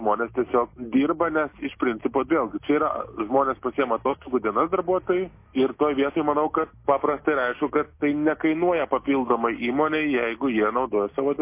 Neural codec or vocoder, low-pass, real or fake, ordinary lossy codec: none; 3.6 kHz; real; MP3, 24 kbps